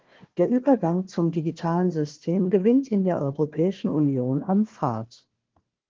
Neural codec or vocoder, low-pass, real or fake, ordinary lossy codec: codec, 16 kHz, 1 kbps, FunCodec, trained on Chinese and English, 50 frames a second; 7.2 kHz; fake; Opus, 16 kbps